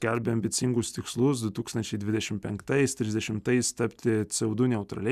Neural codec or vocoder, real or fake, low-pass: none; real; 14.4 kHz